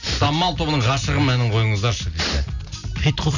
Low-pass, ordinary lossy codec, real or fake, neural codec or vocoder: 7.2 kHz; none; real; none